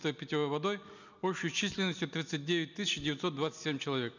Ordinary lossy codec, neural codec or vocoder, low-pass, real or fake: none; none; 7.2 kHz; real